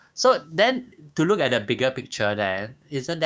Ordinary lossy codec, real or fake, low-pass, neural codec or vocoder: none; fake; none; codec, 16 kHz, 6 kbps, DAC